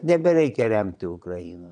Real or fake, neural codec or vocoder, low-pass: fake; vocoder, 22.05 kHz, 80 mel bands, Vocos; 9.9 kHz